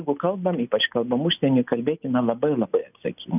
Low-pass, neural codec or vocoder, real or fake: 3.6 kHz; none; real